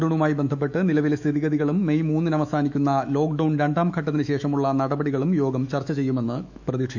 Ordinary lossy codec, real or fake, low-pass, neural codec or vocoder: none; fake; 7.2 kHz; autoencoder, 48 kHz, 128 numbers a frame, DAC-VAE, trained on Japanese speech